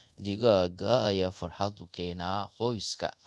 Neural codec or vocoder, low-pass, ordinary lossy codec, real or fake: codec, 24 kHz, 0.5 kbps, DualCodec; none; none; fake